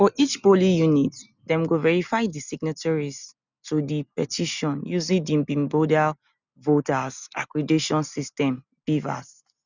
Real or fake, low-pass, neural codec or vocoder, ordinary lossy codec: real; 7.2 kHz; none; none